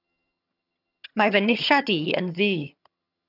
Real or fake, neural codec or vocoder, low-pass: fake; vocoder, 22.05 kHz, 80 mel bands, HiFi-GAN; 5.4 kHz